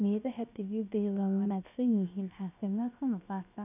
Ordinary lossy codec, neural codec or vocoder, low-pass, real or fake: none; codec, 16 kHz, 0.7 kbps, FocalCodec; 3.6 kHz; fake